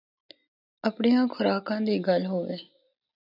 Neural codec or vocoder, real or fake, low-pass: none; real; 5.4 kHz